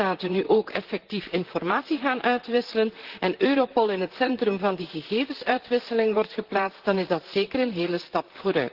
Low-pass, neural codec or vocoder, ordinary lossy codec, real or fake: 5.4 kHz; vocoder, 44.1 kHz, 128 mel bands, Pupu-Vocoder; Opus, 16 kbps; fake